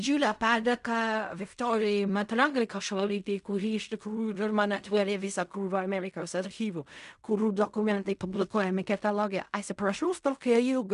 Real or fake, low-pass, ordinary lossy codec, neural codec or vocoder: fake; 10.8 kHz; MP3, 96 kbps; codec, 16 kHz in and 24 kHz out, 0.4 kbps, LongCat-Audio-Codec, fine tuned four codebook decoder